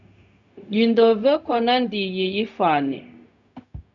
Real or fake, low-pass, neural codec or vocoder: fake; 7.2 kHz; codec, 16 kHz, 0.4 kbps, LongCat-Audio-Codec